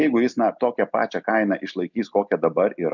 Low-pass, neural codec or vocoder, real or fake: 7.2 kHz; none; real